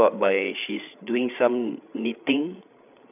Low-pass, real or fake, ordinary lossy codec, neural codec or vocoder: 3.6 kHz; fake; none; codec, 16 kHz, 8 kbps, FreqCodec, larger model